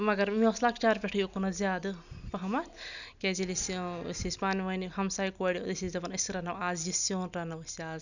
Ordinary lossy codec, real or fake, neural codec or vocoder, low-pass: none; real; none; 7.2 kHz